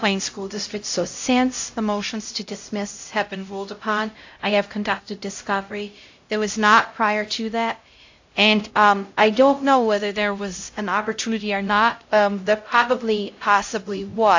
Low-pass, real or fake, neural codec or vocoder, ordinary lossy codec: 7.2 kHz; fake; codec, 16 kHz, 0.5 kbps, X-Codec, HuBERT features, trained on LibriSpeech; AAC, 48 kbps